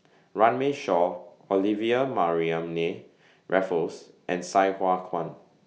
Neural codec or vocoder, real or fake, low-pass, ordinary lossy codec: none; real; none; none